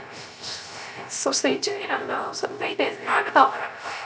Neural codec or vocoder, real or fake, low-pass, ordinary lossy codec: codec, 16 kHz, 0.3 kbps, FocalCodec; fake; none; none